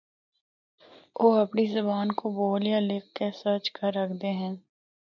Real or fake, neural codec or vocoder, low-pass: real; none; 7.2 kHz